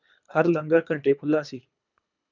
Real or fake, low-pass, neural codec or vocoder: fake; 7.2 kHz; codec, 24 kHz, 3 kbps, HILCodec